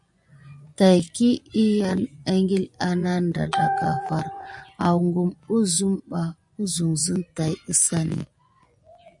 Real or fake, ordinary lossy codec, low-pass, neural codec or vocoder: fake; MP3, 96 kbps; 10.8 kHz; vocoder, 24 kHz, 100 mel bands, Vocos